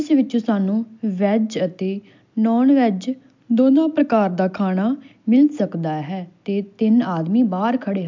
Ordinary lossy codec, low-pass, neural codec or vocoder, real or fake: MP3, 64 kbps; 7.2 kHz; none; real